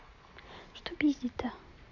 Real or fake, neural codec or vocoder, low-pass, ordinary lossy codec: real; none; 7.2 kHz; none